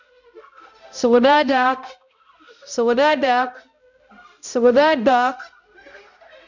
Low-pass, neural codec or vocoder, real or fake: 7.2 kHz; codec, 16 kHz, 0.5 kbps, X-Codec, HuBERT features, trained on balanced general audio; fake